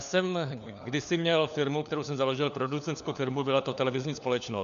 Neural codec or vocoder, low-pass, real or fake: codec, 16 kHz, 4 kbps, FunCodec, trained on LibriTTS, 50 frames a second; 7.2 kHz; fake